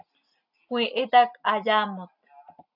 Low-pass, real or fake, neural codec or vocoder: 5.4 kHz; real; none